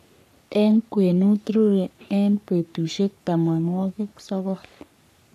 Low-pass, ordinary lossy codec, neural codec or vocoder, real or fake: 14.4 kHz; none; codec, 44.1 kHz, 3.4 kbps, Pupu-Codec; fake